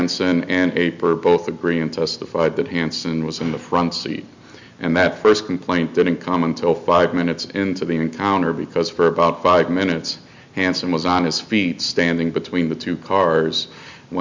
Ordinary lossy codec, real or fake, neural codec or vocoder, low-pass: MP3, 64 kbps; real; none; 7.2 kHz